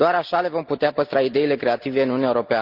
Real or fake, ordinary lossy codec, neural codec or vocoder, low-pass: real; Opus, 32 kbps; none; 5.4 kHz